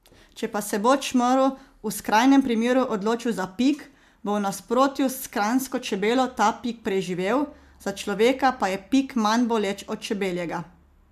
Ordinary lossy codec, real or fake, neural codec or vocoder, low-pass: MP3, 96 kbps; real; none; 14.4 kHz